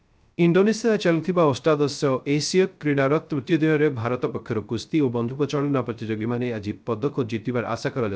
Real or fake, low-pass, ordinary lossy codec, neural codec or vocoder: fake; none; none; codec, 16 kHz, 0.3 kbps, FocalCodec